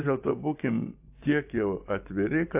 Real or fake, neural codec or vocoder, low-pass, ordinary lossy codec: fake; vocoder, 24 kHz, 100 mel bands, Vocos; 3.6 kHz; AAC, 32 kbps